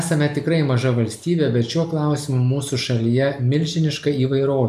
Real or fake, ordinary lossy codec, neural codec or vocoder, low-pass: real; MP3, 96 kbps; none; 14.4 kHz